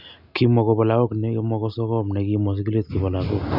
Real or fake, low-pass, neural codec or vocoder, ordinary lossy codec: real; 5.4 kHz; none; none